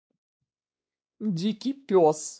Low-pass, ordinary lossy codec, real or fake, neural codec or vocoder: none; none; fake; codec, 16 kHz, 2 kbps, X-Codec, WavLM features, trained on Multilingual LibriSpeech